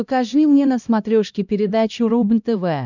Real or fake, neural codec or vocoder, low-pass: fake; codec, 16 kHz, 1 kbps, X-Codec, HuBERT features, trained on LibriSpeech; 7.2 kHz